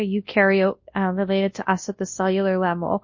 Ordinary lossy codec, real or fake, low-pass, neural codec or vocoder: MP3, 32 kbps; fake; 7.2 kHz; codec, 24 kHz, 0.9 kbps, WavTokenizer, large speech release